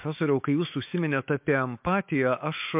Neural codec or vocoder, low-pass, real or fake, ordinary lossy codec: autoencoder, 48 kHz, 128 numbers a frame, DAC-VAE, trained on Japanese speech; 3.6 kHz; fake; MP3, 32 kbps